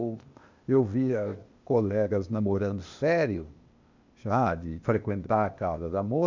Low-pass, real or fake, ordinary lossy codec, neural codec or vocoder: 7.2 kHz; fake; MP3, 64 kbps; codec, 16 kHz, 0.8 kbps, ZipCodec